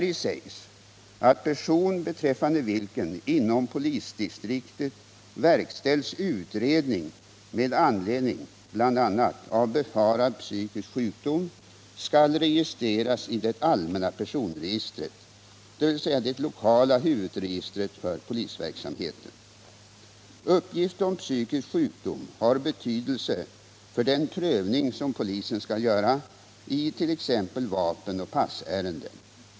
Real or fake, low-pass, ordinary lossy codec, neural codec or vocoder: real; none; none; none